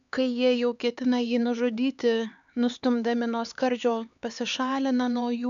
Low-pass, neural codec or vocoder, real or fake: 7.2 kHz; codec, 16 kHz, 4 kbps, X-Codec, HuBERT features, trained on LibriSpeech; fake